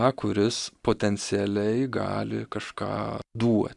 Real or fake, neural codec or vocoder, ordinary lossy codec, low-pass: real; none; Opus, 64 kbps; 10.8 kHz